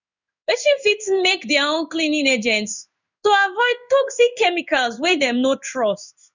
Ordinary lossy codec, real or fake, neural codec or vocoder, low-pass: none; fake; codec, 16 kHz in and 24 kHz out, 1 kbps, XY-Tokenizer; 7.2 kHz